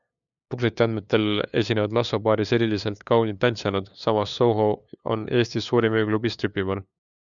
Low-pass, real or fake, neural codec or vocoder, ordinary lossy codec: 7.2 kHz; fake; codec, 16 kHz, 2 kbps, FunCodec, trained on LibriTTS, 25 frames a second; MP3, 96 kbps